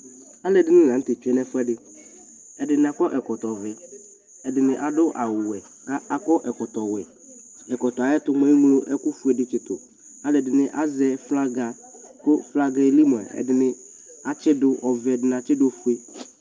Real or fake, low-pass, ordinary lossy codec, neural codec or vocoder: real; 7.2 kHz; Opus, 24 kbps; none